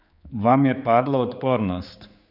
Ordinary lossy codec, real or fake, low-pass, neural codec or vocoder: none; fake; 5.4 kHz; codec, 16 kHz, 4 kbps, X-Codec, HuBERT features, trained on general audio